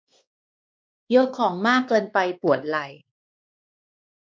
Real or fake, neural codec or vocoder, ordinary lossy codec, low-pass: fake; codec, 16 kHz, 2 kbps, X-Codec, WavLM features, trained on Multilingual LibriSpeech; none; none